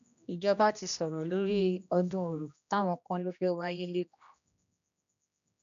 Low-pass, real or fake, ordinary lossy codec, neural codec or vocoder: 7.2 kHz; fake; none; codec, 16 kHz, 1 kbps, X-Codec, HuBERT features, trained on general audio